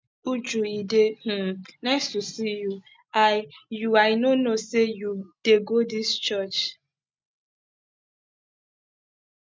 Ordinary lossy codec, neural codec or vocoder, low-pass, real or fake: none; none; none; real